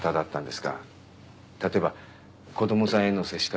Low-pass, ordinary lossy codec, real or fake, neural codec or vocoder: none; none; real; none